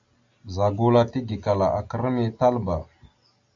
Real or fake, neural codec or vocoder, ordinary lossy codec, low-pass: real; none; MP3, 64 kbps; 7.2 kHz